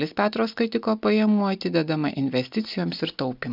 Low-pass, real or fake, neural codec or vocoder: 5.4 kHz; real; none